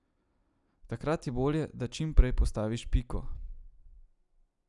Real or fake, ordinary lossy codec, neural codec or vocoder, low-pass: real; none; none; 10.8 kHz